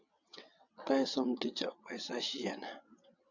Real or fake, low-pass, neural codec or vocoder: fake; 7.2 kHz; vocoder, 22.05 kHz, 80 mel bands, WaveNeXt